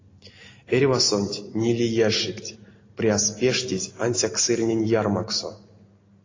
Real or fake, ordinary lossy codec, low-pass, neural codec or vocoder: real; AAC, 32 kbps; 7.2 kHz; none